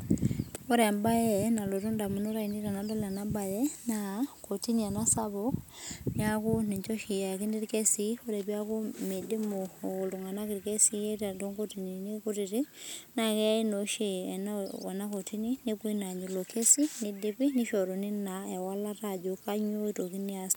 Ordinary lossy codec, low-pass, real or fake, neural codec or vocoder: none; none; real; none